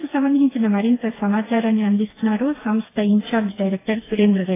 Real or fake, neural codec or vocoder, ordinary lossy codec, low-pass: fake; codec, 16 kHz, 2 kbps, FreqCodec, smaller model; AAC, 16 kbps; 3.6 kHz